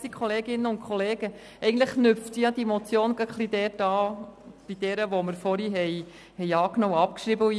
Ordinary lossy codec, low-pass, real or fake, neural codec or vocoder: none; none; real; none